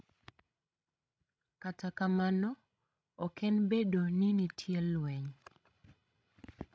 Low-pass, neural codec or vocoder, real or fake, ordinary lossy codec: none; codec, 16 kHz, 16 kbps, FreqCodec, larger model; fake; none